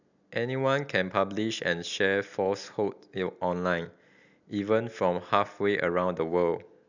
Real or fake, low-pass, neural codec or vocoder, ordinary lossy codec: real; 7.2 kHz; none; none